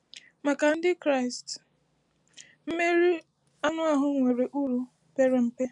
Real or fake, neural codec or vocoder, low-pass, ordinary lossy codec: real; none; 10.8 kHz; none